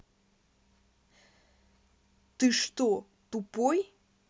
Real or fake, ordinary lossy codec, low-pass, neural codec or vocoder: real; none; none; none